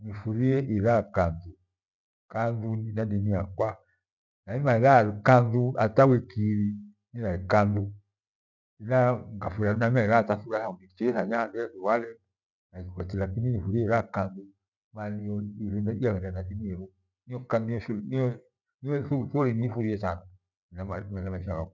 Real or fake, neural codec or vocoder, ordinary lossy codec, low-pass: real; none; none; 7.2 kHz